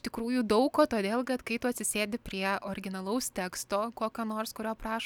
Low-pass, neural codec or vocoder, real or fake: 19.8 kHz; none; real